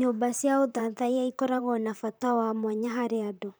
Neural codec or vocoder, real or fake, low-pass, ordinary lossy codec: vocoder, 44.1 kHz, 128 mel bands, Pupu-Vocoder; fake; none; none